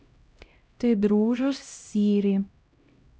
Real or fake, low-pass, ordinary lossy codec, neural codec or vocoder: fake; none; none; codec, 16 kHz, 0.5 kbps, X-Codec, HuBERT features, trained on LibriSpeech